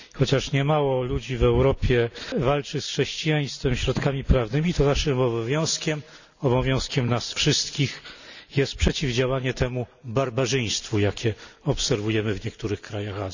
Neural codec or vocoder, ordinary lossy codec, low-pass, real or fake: none; none; 7.2 kHz; real